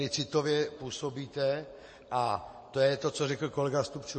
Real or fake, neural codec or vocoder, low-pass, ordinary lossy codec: real; none; 9.9 kHz; MP3, 32 kbps